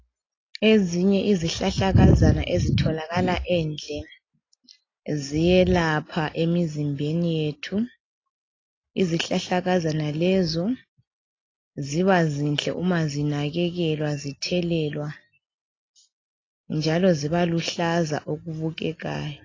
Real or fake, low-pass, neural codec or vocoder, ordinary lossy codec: real; 7.2 kHz; none; AAC, 32 kbps